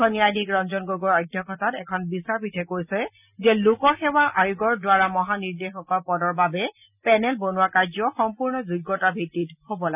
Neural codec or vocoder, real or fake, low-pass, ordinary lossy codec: none; real; 3.6 kHz; none